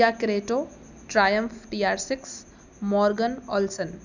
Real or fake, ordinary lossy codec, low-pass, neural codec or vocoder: real; none; 7.2 kHz; none